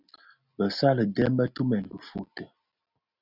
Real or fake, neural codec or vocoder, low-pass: real; none; 5.4 kHz